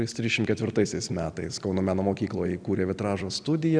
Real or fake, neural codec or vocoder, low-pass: real; none; 9.9 kHz